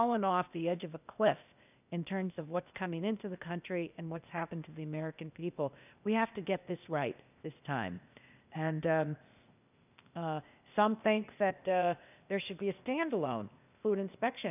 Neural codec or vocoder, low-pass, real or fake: codec, 16 kHz, 0.8 kbps, ZipCodec; 3.6 kHz; fake